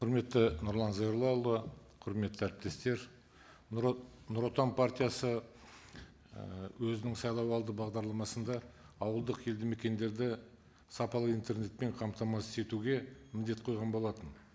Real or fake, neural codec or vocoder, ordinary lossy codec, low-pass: real; none; none; none